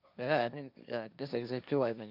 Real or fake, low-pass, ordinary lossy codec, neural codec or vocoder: fake; 5.4 kHz; none; codec, 16 kHz, 1.1 kbps, Voila-Tokenizer